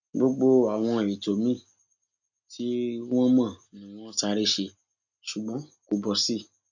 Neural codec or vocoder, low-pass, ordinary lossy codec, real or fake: none; 7.2 kHz; none; real